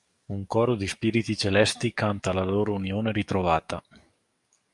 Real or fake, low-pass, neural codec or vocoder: fake; 10.8 kHz; codec, 44.1 kHz, 7.8 kbps, DAC